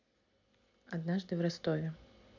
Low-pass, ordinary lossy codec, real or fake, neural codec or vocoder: 7.2 kHz; AAC, 48 kbps; real; none